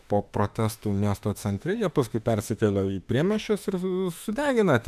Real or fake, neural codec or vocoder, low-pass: fake; autoencoder, 48 kHz, 32 numbers a frame, DAC-VAE, trained on Japanese speech; 14.4 kHz